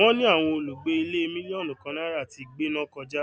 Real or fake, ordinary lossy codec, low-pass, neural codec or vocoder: real; none; none; none